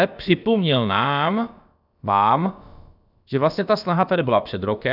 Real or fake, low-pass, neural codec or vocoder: fake; 5.4 kHz; codec, 16 kHz, about 1 kbps, DyCAST, with the encoder's durations